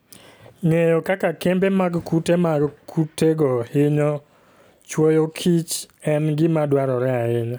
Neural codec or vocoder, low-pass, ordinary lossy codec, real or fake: none; none; none; real